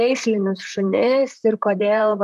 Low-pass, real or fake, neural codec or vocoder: 14.4 kHz; fake; vocoder, 44.1 kHz, 128 mel bands, Pupu-Vocoder